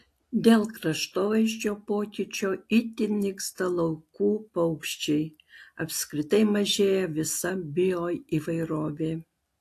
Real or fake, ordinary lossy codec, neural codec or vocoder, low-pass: real; AAC, 64 kbps; none; 14.4 kHz